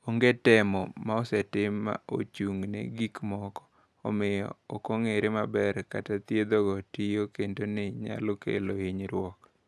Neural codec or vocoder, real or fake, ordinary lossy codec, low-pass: none; real; none; none